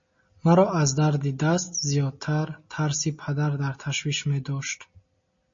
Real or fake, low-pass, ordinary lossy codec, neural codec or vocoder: real; 7.2 kHz; MP3, 32 kbps; none